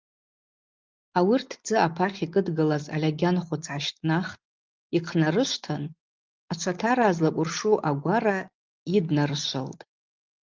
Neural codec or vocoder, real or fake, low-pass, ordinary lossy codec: none; real; 7.2 kHz; Opus, 32 kbps